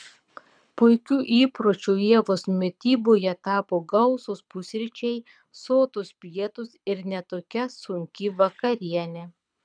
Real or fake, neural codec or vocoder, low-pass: fake; codec, 24 kHz, 6 kbps, HILCodec; 9.9 kHz